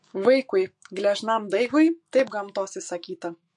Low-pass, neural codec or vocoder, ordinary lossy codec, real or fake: 10.8 kHz; autoencoder, 48 kHz, 128 numbers a frame, DAC-VAE, trained on Japanese speech; MP3, 48 kbps; fake